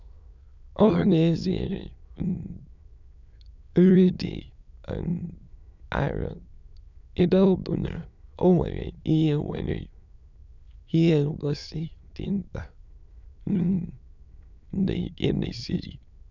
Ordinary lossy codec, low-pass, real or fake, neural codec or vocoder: none; 7.2 kHz; fake; autoencoder, 22.05 kHz, a latent of 192 numbers a frame, VITS, trained on many speakers